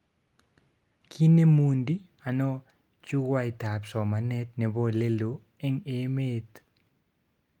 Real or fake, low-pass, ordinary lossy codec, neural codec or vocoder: real; 19.8 kHz; Opus, 32 kbps; none